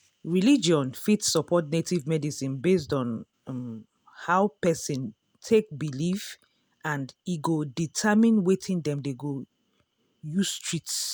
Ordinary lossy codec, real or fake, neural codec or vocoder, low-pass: none; real; none; none